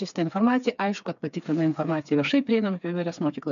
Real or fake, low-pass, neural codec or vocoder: fake; 7.2 kHz; codec, 16 kHz, 4 kbps, FreqCodec, smaller model